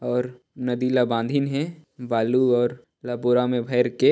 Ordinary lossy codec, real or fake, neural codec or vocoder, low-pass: none; real; none; none